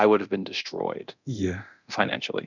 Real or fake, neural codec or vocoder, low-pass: fake; codec, 24 kHz, 0.9 kbps, DualCodec; 7.2 kHz